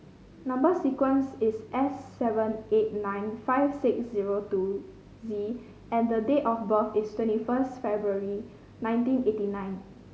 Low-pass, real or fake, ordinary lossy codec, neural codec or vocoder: none; real; none; none